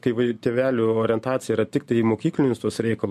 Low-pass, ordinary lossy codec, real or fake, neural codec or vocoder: 14.4 kHz; MP3, 64 kbps; real; none